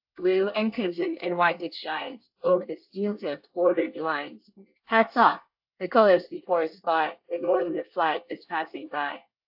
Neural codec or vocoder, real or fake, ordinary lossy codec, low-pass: codec, 24 kHz, 1 kbps, SNAC; fake; AAC, 48 kbps; 5.4 kHz